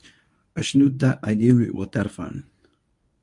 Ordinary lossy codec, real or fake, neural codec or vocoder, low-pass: MP3, 64 kbps; fake; codec, 24 kHz, 0.9 kbps, WavTokenizer, medium speech release version 1; 10.8 kHz